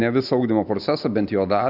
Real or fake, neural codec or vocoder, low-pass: real; none; 5.4 kHz